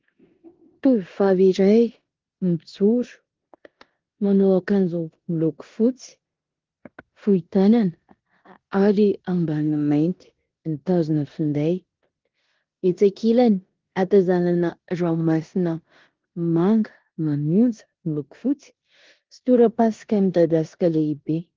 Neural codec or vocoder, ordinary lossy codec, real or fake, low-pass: codec, 16 kHz in and 24 kHz out, 0.9 kbps, LongCat-Audio-Codec, four codebook decoder; Opus, 16 kbps; fake; 7.2 kHz